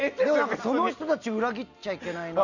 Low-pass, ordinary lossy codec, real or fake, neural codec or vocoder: 7.2 kHz; none; real; none